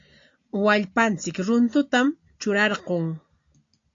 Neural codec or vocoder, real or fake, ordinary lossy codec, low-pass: none; real; AAC, 48 kbps; 7.2 kHz